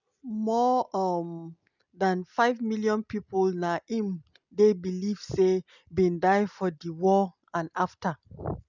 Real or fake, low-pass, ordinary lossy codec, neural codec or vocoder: real; 7.2 kHz; none; none